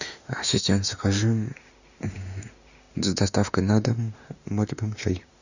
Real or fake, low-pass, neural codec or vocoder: fake; 7.2 kHz; autoencoder, 48 kHz, 32 numbers a frame, DAC-VAE, trained on Japanese speech